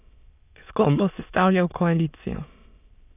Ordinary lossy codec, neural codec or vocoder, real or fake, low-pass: none; autoencoder, 22.05 kHz, a latent of 192 numbers a frame, VITS, trained on many speakers; fake; 3.6 kHz